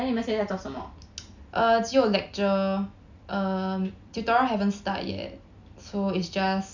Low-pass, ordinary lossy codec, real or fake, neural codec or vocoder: 7.2 kHz; none; real; none